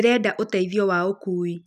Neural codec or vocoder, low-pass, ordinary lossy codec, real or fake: none; 14.4 kHz; none; real